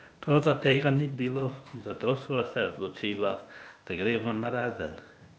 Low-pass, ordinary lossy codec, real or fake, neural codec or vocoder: none; none; fake; codec, 16 kHz, 0.8 kbps, ZipCodec